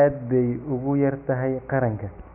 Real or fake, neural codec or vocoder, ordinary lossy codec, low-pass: real; none; none; 3.6 kHz